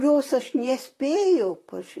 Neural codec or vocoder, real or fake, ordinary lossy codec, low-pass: vocoder, 44.1 kHz, 128 mel bands, Pupu-Vocoder; fake; AAC, 48 kbps; 14.4 kHz